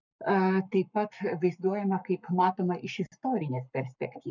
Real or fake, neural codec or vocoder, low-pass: fake; codec, 44.1 kHz, 7.8 kbps, Pupu-Codec; 7.2 kHz